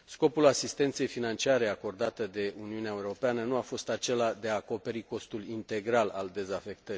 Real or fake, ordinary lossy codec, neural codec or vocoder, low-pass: real; none; none; none